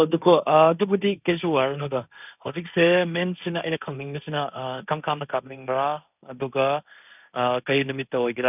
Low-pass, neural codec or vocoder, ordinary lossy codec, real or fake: 3.6 kHz; codec, 16 kHz, 1.1 kbps, Voila-Tokenizer; none; fake